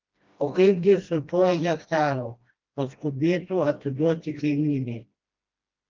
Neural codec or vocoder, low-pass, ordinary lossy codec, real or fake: codec, 16 kHz, 1 kbps, FreqCodec, smaller model; 7.2 kHz; Opus, 24 kbps; fake